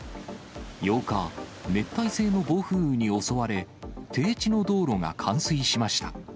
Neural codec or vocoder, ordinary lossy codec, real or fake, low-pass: none; none; real; none